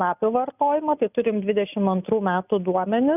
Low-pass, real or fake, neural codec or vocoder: 3.6 kHz; real; none